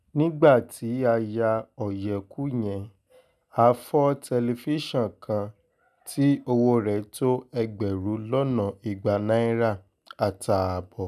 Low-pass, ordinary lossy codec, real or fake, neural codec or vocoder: 14.4 kHz; none; real; none